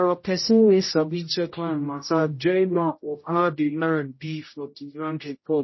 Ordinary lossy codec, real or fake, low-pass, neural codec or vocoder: MP3, 24 kbps; fake; 7.2 kHz; codec, 16 kHz, 0.5 kbps, X-Codec, HuBERT features, trained on general audio